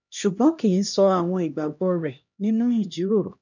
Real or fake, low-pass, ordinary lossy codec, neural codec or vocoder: fake; 7.2 kHz; none; codec, 16 kHz, 1 kbps, X-Codec, HuBERT features, trained on LibriSpeech